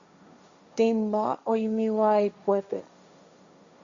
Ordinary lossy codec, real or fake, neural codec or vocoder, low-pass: Opus, 64 kbps; fake; codec, 16 kHz, 1.1 kbps, Voila-Tokenizer; 7.2 kHz